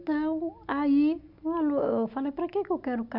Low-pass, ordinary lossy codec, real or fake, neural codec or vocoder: 5.4 kHz; none; real; none